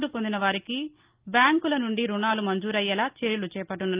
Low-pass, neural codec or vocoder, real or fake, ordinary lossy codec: 3.6 kHz; none; real; Opus, 24 kbps